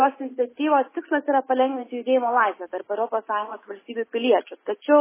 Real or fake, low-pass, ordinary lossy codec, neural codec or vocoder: real; 3.6 kHz; MP3, 16 kbps; none